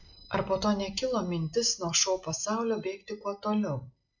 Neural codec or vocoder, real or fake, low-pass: none; real; 7.2 kHz